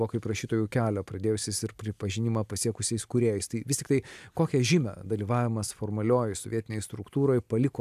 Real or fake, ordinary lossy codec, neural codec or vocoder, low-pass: real; AAC, 96 kbps; none; 14.4 kHz